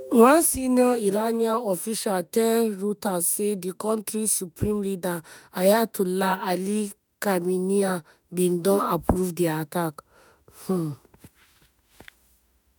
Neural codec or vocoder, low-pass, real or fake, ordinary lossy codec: autoencoder, 48 kHz, 32 numbers a frame, DAC-VAE, trained on Japanese speech; none; fake; none